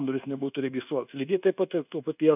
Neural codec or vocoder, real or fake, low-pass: codec, 24 kHz, 1.2 kbps, DualCodec; fake; 3.6 kHz